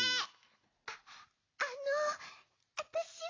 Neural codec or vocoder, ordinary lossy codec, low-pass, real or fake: none; AAC, 32 kbps; 7.2 kHz; real